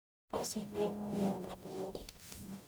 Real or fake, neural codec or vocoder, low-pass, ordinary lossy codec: fake; codec, 44.1 kHz, 0.9 kbps, DAC; none; none